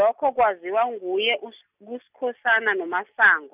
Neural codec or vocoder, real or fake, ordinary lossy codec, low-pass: none; real; none; 3.6 kHz